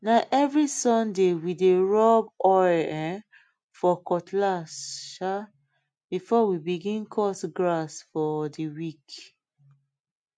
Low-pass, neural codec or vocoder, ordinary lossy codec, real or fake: 9.9 kHz; none; MP3, 64 kbps; real